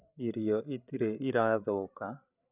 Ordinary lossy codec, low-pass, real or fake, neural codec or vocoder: none; 3.6 kHz; fake; codec, 16 kHz, 8 kbps, FreqCodec, larger model